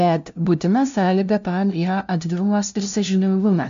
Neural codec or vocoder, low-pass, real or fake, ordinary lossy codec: codec, 16 kHz, 0.5 kbps, FunCodec, trained on LibriTTS, 25 frames a second; 7.2 kHz; fake; MP3, 64 kbps